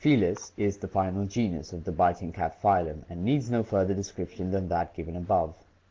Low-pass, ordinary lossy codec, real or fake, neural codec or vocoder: 7.2 kHz; Opus, 16 kbps; real; none